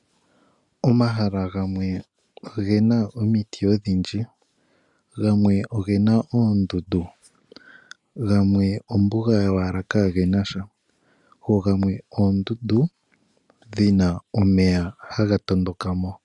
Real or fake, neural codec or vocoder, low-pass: real; none; 10.8 kHz